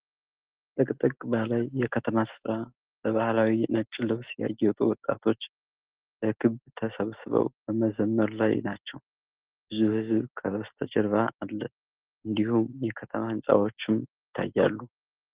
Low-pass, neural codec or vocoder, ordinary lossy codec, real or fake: 3.6 kHz; none; Opus, 16 kbps; real